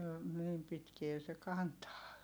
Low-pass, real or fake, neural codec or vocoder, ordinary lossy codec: none; real; none; none